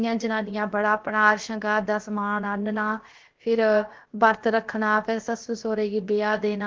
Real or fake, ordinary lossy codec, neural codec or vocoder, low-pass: fake; Opus, 16 kbps; codec, 16 kHz, about 1 kbps, DyCAST, with the encoder's durations; 7.2 kHz